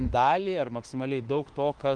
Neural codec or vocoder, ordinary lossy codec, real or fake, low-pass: autoencoder, 48 kHz, 32 numbers a frame, DAC-VAE, trained on Japanese speech; Opus, 24 kbps; fake; 9.9 kHz